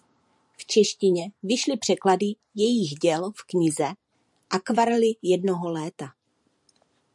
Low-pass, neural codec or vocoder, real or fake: 10.8 kHz; vocoder, 44.1 kHz, 128 mel bands every 512 samples, BigVGAN v2; fake